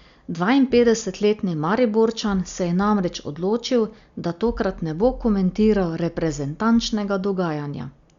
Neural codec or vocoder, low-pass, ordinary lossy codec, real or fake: none; 7.2 kHz; Opus, 64 kbps; real